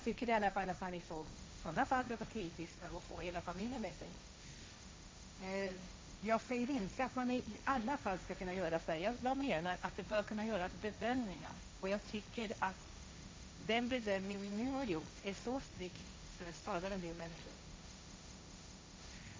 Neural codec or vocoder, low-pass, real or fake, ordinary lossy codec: codec, 16 kHz, 1.1 kbps, Voila-Tokenizer; none; fake; none